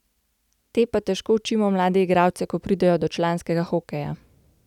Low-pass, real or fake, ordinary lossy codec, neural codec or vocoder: 19.8 kHz; real; none; none